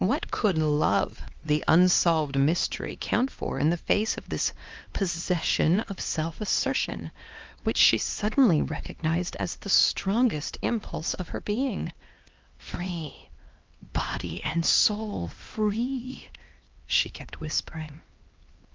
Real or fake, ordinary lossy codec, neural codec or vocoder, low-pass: fake; Opus, 32 kbps; codec, 16 kHz, 2 kbps, X-Codec, HuBERT features, trained on LibriSpeech; 7.2 kHz